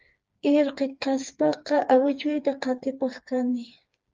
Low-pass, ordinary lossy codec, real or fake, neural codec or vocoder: 7.2 kHz; Opus, 24 kbps; fake; codec, 16 kHz, 4 kbps, FreqCodec, smaller model